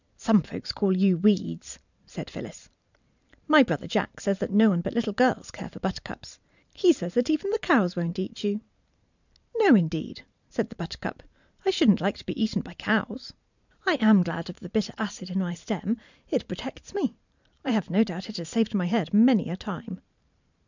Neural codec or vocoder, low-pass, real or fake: vocoder, 44.1 kHz, 128 mel bands every 512 samples, BigVGAN v2; 7.2 kHz; fake